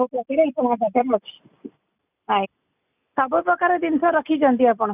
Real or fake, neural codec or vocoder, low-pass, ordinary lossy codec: real; none; 3.6 kHz; none